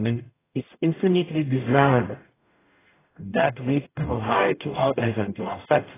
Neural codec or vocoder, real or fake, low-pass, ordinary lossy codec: codec, 44.1 kHz, 0.9 kbps, DAC; fake; 3.6 kHz; AAC, 16 kbps